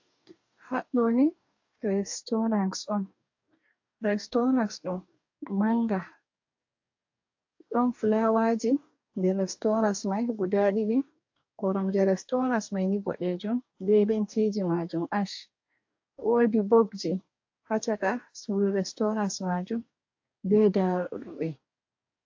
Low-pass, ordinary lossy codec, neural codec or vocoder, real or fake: 7.2 kHz; AAC, 48 kbps; codec, 44.1 kHz, 2.6 kbps, DAC; fake